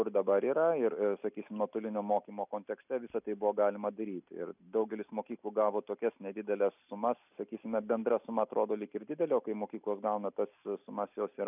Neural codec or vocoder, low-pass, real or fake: none; 3.6 kHz; real